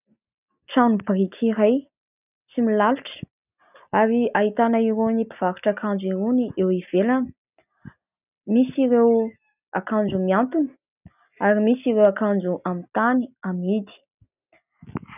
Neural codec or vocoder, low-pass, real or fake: none; 3.6 kHz; real